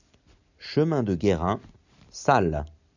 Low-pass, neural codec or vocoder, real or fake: 7.2 kHz; none; real